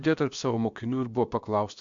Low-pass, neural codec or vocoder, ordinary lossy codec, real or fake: 7.2 kHz; codec, 16 kHz, about 1 kbps, DyCAST, with the encoder's durations; MP3, 64 kbps; fake